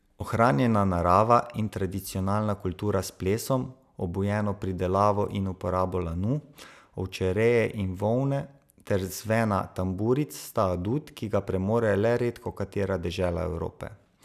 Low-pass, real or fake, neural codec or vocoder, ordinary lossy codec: 14.4 kHz; real; none; none